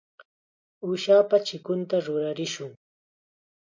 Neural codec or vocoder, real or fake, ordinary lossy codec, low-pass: none; real; MP3, 48 kbps; 7.2 kHz